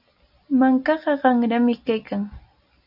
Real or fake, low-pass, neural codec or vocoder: real; 5.4 kHz; none